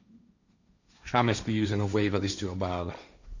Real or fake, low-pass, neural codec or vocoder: fake; 7.2 kHz; codec, 16 kHz, 1.1 kbps, Voila-Tokenizer